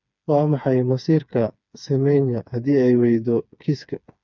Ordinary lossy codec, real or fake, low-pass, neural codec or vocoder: none; fake; 7.2 kHz; codec, 16 kHz, 4 kbps, FreqCodec, smaller model